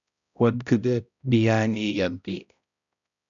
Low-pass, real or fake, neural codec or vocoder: 7.2 kHz; fake; codec, 16 kHz, 0.5 kbps, X-Codec, HuBERT features, trained on balanced general audio